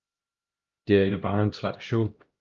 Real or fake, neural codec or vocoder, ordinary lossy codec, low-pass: fake; codec, 16 kHz, 1 kbps, X-Codec, HuBERT features, trained on LibriSpeech; Opus, 32 kbps; 7.2 kHz